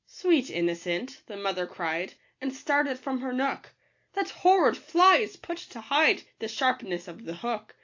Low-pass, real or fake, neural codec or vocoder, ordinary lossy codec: 7.2 kHz; real; none; AAC, 48 kbps